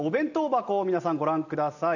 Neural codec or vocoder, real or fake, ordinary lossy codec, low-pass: none; real; none; 7.2 kHz